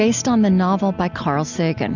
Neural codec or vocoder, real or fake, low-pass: none; real; 7.2 kHz